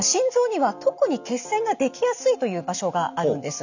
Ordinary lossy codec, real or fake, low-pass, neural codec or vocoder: none; real; 7.2 kHz; none